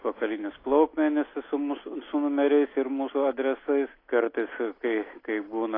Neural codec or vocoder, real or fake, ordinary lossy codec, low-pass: none; real; AAC, 24 kbps; 5.4 kHz